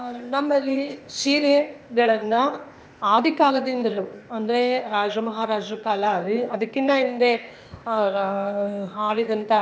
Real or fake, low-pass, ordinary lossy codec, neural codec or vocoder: fake; none; none; codec, 16 kHz, 0.8 kbps, ZipCodec